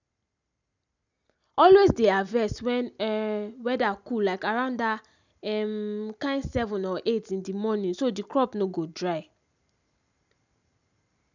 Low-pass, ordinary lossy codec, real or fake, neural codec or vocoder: 7.2 kHz; none; real; none